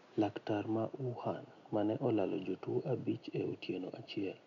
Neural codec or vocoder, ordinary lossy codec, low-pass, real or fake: none; none; 7.2 kHz; real